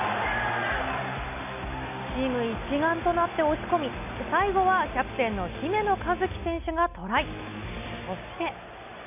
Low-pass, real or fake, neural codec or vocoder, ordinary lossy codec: 3.6 kHz; real; none; none